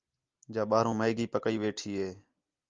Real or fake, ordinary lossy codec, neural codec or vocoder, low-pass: real; Opus, 32 kbps; none; 7.2 kHz